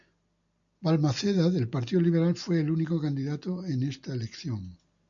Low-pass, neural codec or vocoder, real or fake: 7.2 kHz; none; real